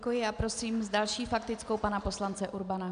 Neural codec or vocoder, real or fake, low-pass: none; real; 9.9 kHz